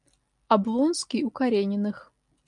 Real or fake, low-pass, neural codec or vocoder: real; 10.8 kHz; none